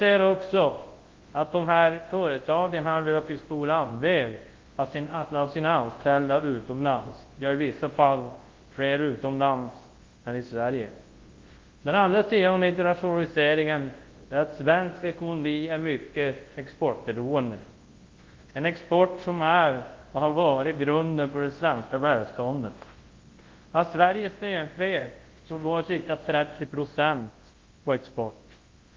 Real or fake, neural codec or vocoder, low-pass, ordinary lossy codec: fake; codec, 24 kHz, 0.9 kbps, WavTokenizer, large speech release; 7.2 kHz; Opus, 16 kbps